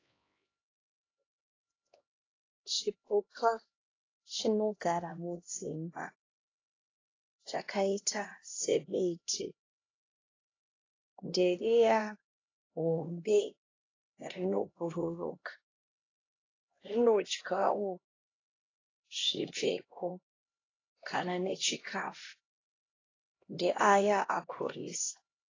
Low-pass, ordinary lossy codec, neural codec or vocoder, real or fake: 7.2 kHz; AAC, 32 kbps; codec, 16 kHz, 1 kbps, X-Codec, HuBERT features, trained on LibriSpeech; fake